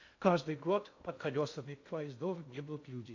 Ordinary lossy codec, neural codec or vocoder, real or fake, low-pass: AAC, 48 kbps; codec, 16 kHz in and 24 kHz out, 0.6 kbps, FocalCodec, streaming, 2048 codes; fake; 7.2 kHz